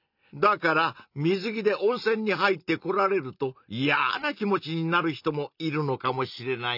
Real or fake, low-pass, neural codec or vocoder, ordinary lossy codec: real; 5.4 kHz; none; none